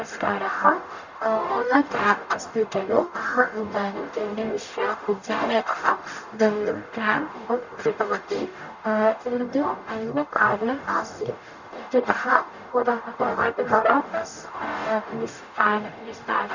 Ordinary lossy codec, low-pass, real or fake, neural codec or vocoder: none; 7.2 kHz; fake; codec, 44.1 kHz, 0.9 kbps, DAC